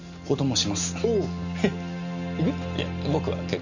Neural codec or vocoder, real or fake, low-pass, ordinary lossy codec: none; real; 7.2 kHz; none